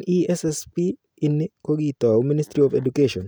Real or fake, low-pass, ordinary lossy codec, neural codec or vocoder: real; none; none; none